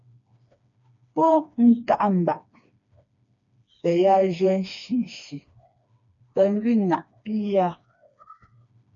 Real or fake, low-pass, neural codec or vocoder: fake; 7.2 kHz; codec, 16 kHz, 2 kbps, FreqCodec, smaller model